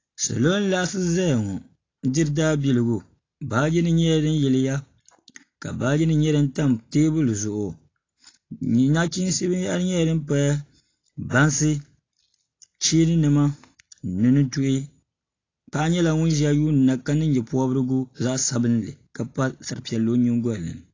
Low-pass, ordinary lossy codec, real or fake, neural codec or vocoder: 7.2 kHz; AAC, 32 kbps; real; none